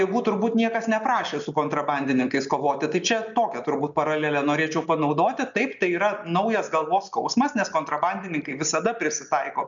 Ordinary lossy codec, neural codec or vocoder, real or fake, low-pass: MP3, 96 kbps; none; real; 7.2 kHz